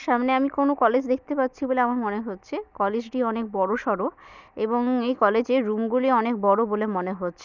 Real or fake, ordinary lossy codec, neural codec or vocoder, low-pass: real; none; none; 7.2 kHz